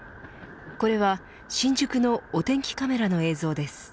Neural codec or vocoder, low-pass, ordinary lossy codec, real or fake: none; none; none; real